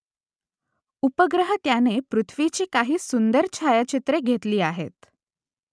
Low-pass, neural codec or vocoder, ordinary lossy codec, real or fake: none; none; none; real